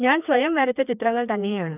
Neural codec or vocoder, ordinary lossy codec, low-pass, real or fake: codec, 16 kHz, 2 kbps, FreqCodec, larger model; none; 3.6 kHz; fake